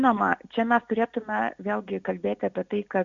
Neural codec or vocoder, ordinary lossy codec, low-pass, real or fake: none; Opus, 64 kbps; 7.2 kHz; real